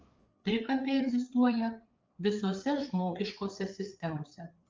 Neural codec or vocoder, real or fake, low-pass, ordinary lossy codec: codec, 16 kHz, 4 kbps, FreqCodec, larger model; fake; 7.2 kHz; Opus, 24 kbps